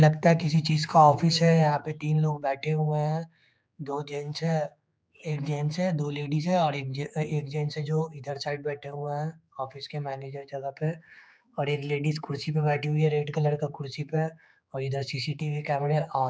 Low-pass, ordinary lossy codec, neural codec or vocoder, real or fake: none; none; codec, 16 kHz, 4 kbps, X-Codec, HuBERT features, trained on general audio; fake